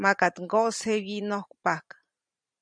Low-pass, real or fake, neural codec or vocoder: 9.9 kHz; fake; vocoder, 44.1 kHz, 128 mel bands every 512 samples, BigVGAN v2